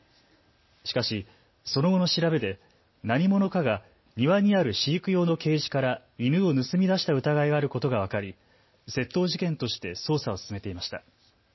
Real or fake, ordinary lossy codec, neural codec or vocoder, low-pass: real; MP3, 24 kbps; none; 7.2 kHz